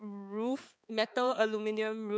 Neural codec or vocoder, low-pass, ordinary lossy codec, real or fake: codec, 16 kHz, 4 kbps, X-Codec, HuBERT features, trained on balanced general audio; none; none; fake